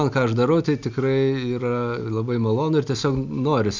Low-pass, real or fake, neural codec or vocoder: 7.2 kHz; real; none